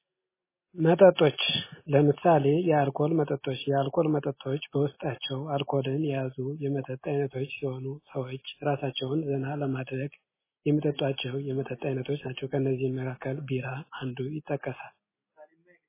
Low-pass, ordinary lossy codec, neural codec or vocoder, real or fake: 3.6 kHz; MP3, 16 kbps; none; real